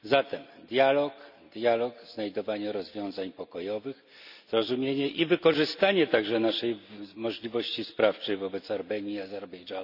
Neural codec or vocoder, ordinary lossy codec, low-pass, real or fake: none; none; 5.4 kHz; real